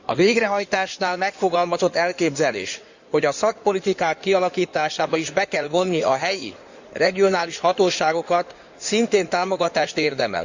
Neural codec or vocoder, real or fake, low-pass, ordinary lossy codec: codec, 16 kHz in and 24 kHz out, 2.2 kbps, FireRedTTS-2 codec; fake; 7.2 kHz; Opus, 64 kbps